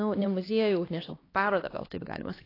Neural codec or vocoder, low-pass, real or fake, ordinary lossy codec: codec, 16 kHz, 2 kbps, X-Codec, HuBERT features, trained on LibriSpeech; 5.4 kHz; fake; AAC, 24 kbps